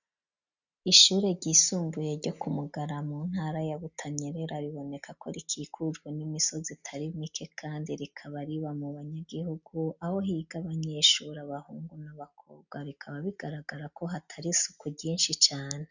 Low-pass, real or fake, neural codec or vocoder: 7.2 kHz; real; none